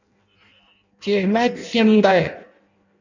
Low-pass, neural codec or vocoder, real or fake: 7.2 kHz; codec, 16 kHz in and 24 kHz out, 0.6 kbps, FireRedTTS-2 codec; fake